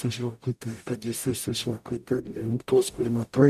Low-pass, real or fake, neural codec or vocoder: 14.4 kHz; fake; codec, 44.1 kHz, 0.9 kbps, DAC